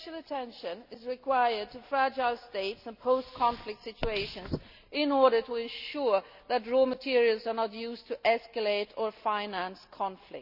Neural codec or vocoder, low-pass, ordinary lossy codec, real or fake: none; 5.4 kHz; none; real